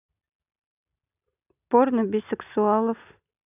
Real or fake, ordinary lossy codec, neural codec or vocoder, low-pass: real; none; none; 3.6 kHz